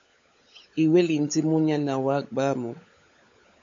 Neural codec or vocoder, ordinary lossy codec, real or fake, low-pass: codec, 16 kHz, 16 kbps, FunCodec, trained on LibriTTS, 50 frames a second; MP3, 48 kbps; fake; 7.2 kHz